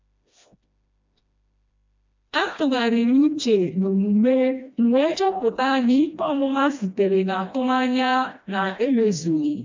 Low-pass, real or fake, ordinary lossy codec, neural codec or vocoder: 7.2 kHz; fake; MP3, 64 kbps; codec, 16 kHz, 1 kbps, FreqCodec, smaller model